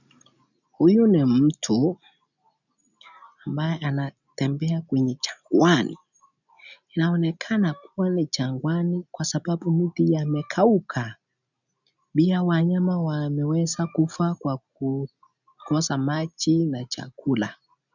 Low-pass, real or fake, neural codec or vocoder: 7.2 kHz; real; none